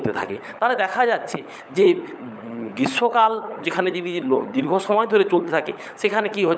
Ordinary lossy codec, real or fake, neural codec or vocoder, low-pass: none; fake; codec, 16 kHz, 16 kbps, FunCodec, trained on LibriTTS, 50 frames a second; none